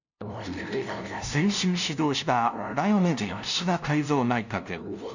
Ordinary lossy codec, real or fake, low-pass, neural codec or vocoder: none; fake; 7.2 kHz; codec, 16 kHz, 0.5 kbps, FunCodec, trained on LibriTTS, 25 frames a second